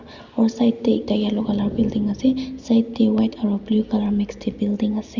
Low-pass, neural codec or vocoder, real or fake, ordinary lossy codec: 7.2 kHz; none; real; Opus, 64 kbps